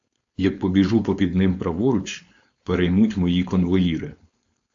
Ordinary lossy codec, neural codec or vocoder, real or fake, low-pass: AAC, 64 kbps; codec, 16 kHz, 4.8 kbps, FACodec; fake; 7.2 kHz